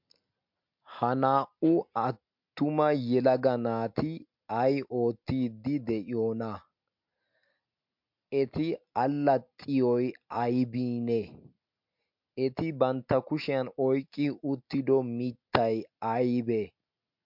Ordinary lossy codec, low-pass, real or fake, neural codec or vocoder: MP3, 48 kbps; 5.4 kHz; real; none